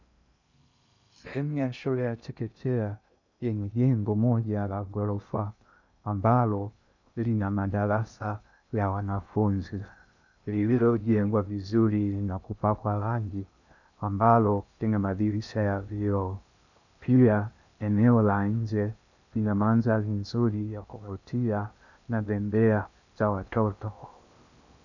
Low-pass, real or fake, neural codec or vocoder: 7.2 kHz; fake; codec, 16 kHz in and 24 kHz out, 0.6 kbps, FocalCodec, streaming, 2048 codes